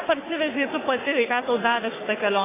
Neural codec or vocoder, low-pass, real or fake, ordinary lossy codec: codec, 44.1 kHz, 3.4 kbps, Pupu-Codec; 3.6 kHz; fake; AAC, 16 kbps